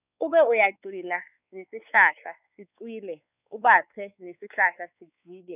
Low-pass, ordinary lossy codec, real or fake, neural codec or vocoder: 3.6 kHz; none; fake; codec, 16 kHz, 4 kbps, X-Codec, WavLM features, trained on Multilingual LibriSpeech